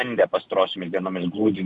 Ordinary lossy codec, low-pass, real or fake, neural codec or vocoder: Opus, 64 kbps; 10.8 kHz; real; none